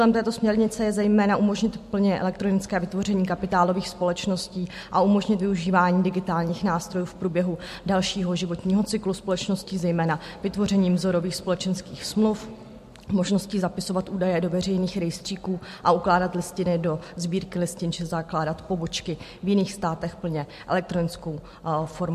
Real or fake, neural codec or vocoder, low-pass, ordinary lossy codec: real; none; 14.4 kHz; MP3, 64 kbps